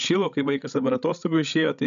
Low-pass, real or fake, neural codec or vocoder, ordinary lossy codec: 7.2 kHz; fake; codec, 16 kHz, 16 kbps, FreqCodec, larger model; MP3, 96 kbps